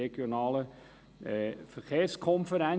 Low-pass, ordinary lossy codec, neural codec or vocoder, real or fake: none; none; none; real